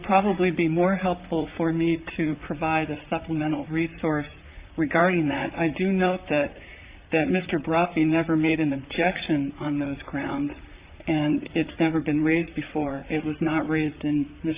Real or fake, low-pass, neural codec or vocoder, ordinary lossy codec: fake; 3.6 kHz; vocoder, 44.1 kHz, 128 mel bands, Pupu-Vocoder; Opus, 64 kbps